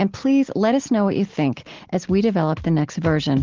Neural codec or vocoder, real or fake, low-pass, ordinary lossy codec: none; real; 7.2 kHz; Opus, 16 kbps